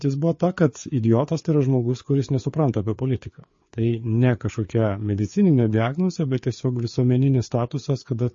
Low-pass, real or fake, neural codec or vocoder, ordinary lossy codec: 7.2 kHz; fake; codec, 16 kHz, 4 kbps, FreqCodec, larger model; MP3, 32 kbps